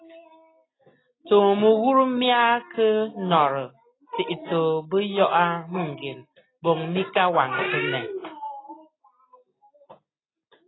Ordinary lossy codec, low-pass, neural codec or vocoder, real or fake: AAC, 16 kbps; 7.2 kHz; none; real